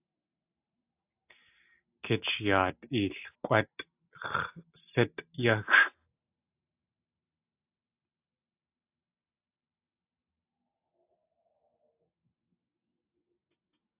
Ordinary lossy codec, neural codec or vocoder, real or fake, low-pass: AAC, 32 kbps; none; real; 3.6 kHz